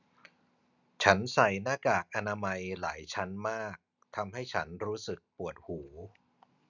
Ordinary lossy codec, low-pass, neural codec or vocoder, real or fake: none; 7.2 kHz; none; real